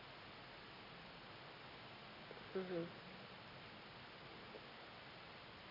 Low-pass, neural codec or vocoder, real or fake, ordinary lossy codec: 5.4 kHz; none; real; none